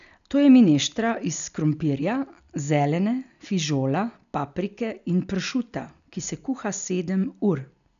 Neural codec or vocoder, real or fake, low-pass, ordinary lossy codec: none; real; 7.2 kHz; none